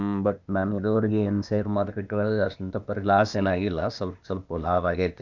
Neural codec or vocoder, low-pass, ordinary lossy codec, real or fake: codec, 16 kHz, 0.8 kbps, ZipCodec; 7.2 kHz; none; fake